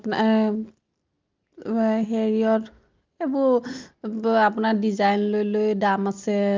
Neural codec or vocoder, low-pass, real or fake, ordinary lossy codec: none; 7.2 kHz; real; Opus, 32 kbps